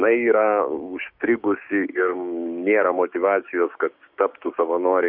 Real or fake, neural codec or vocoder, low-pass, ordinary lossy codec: fake; codec, 16 kHz, 6 kbps, DAC; 5.4 kHz; MP3, 48 kbps